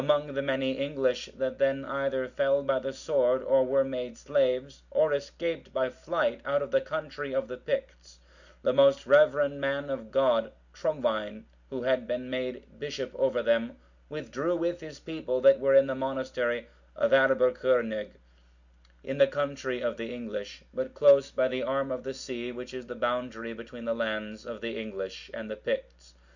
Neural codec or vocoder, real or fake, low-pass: none; real; 7.2 kHz